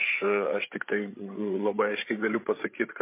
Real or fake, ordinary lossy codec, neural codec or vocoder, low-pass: fake; MP3, 24 kbps; codec, 16 kHz, 8 kbps, FreqCodec, larger model; 3.6 kHz